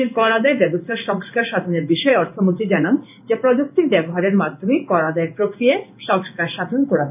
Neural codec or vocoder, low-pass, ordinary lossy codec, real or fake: codec, 16 kHz in and 24 kHz out, 1 kbps, XY-Tokenizer; 3.6 kHz; none; fake